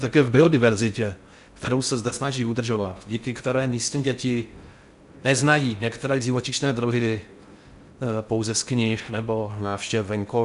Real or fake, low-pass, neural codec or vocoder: fake; 10.8 kHz; codec, 16 kHz in and 24 kHz out, 0.6 kbps, FocalCodec, streaming, 4096 codes